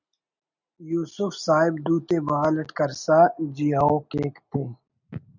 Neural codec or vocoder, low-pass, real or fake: none; 7.2 kHz; real